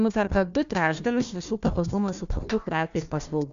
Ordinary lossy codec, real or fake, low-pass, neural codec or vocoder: MP3, 64 kbps; fake; 7.2 kHz; codec, 16 kHz, 1 kbps, FunCodec, trained on Chinese and English, 50 frames a second